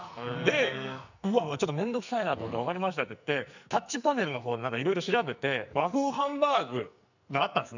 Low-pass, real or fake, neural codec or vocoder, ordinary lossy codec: 7.2 kHz; fake; codec, 44.1 kHz, 2.6 kbps, SNAC; none